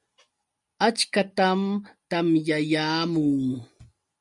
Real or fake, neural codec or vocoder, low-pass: real; none; 10.8 kHz